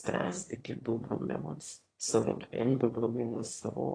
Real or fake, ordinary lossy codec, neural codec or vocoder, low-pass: fake; AAC, 32 kbps; autoencoder, 22.05 kHz, a latent of 192 numbers a frame, VITS, trained on one speaker; 9.9 kHz